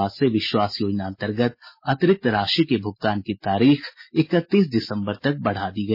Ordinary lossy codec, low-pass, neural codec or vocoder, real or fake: MP3, 24 kbps; 5.4 kHz; none; real